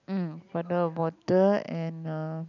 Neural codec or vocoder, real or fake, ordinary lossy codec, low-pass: none; real; none; 7.2 kHz